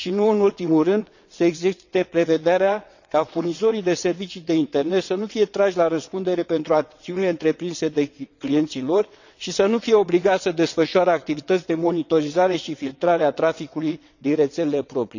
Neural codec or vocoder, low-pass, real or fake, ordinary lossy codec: vocoder, 22.05 kHz, 80 mel bands, WaveNeXt; 7.2 kHz; fake; none